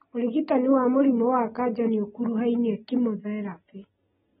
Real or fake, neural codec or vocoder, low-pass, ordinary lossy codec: real; none; 19.8 kHz; AAC, 16 kbps